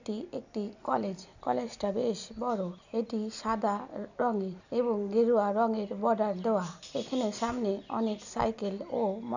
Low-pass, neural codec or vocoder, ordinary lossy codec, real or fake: 7.2 kHz; none; none; real